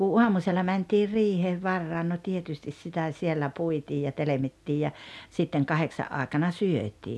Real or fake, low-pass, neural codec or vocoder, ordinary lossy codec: real; none; none; none